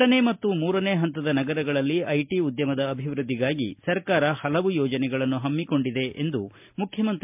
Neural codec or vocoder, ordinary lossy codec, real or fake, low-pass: none; MP3, 32 kbps; real; 3.6 kHz